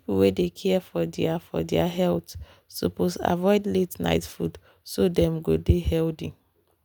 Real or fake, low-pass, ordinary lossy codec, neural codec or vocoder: real; none; none; none